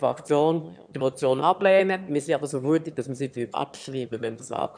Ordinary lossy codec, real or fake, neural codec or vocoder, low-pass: none; fake; autoencoder, 22.05 kHz, a latent of 192 numbers a frame, VITS, trained on one speaker; 9.9 kHz